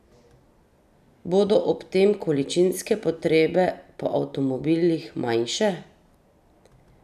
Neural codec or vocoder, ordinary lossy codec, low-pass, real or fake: none; none; 14.4 kHz; real